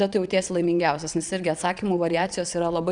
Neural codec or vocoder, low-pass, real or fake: vocoder, 22.05 kHz, 80 mel bands, Vocos; 9.9 kHz; fake